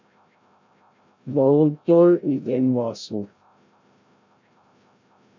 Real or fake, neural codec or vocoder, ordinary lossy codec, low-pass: fake; codec, 16 kHz, 0.5 kbps, FreqCodec, larger model; MP3, 64 kbps; 7.2 kHz